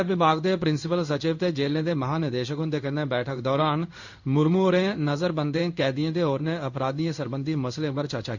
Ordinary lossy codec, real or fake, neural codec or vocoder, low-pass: none; fake; codec, 16 kHz in and 24 kHz out, 1 kbps, XY-Tokenizer; 7.2 kHz